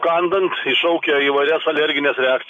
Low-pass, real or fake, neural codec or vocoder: 10.8 kHz; real; none